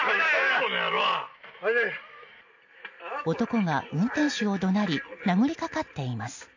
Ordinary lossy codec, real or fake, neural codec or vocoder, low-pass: AAC, 48 kbps; real; none; 7.2 kHz